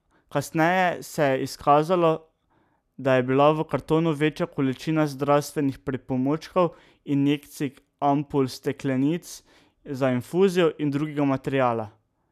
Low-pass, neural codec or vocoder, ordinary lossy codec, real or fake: 14.4 kHz; none; none; real